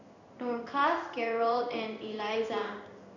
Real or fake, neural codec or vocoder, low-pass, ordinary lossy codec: fake; codec, 16 kHz in and 24 kHz out, 1 kbps, XY-Tokenizer; 7.2 kHz; none